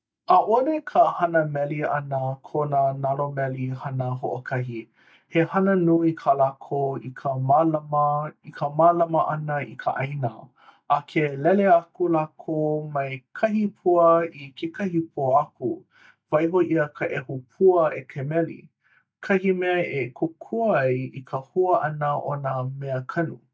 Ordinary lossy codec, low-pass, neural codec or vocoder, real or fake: none; none; none; real